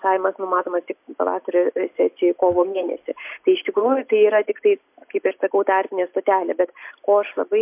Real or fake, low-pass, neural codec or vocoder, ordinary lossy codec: real; 3.6 kHz; none; MP3, 32 kbps